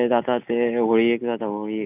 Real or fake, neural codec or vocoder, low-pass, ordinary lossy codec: real; none; 3.6 kHz; none